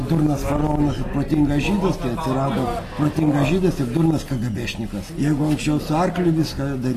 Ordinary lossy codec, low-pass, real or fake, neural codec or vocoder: AAC, 48 kbps; 14.4 kHz; real; none